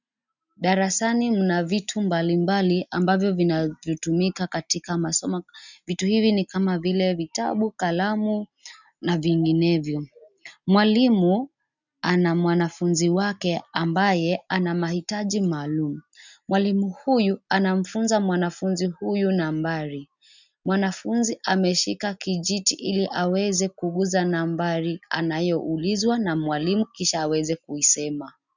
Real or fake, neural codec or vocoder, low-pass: real; none; 7.2 kHz